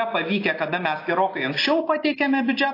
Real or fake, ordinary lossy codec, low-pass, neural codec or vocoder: real; AAC, 32 kbps; 5.4 kHz; none